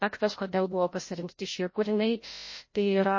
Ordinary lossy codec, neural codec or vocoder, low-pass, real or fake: MP3, 32 kbps; codec, 16 kHz, 0.5 kbps, FreqCodec, larger model; 7.2 kHz; fake